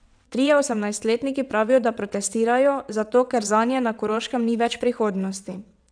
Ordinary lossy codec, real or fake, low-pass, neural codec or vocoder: none; fake; 9.9 kHz; vocoder, 22.05 kHz, 80 mel bands, WaveNeXt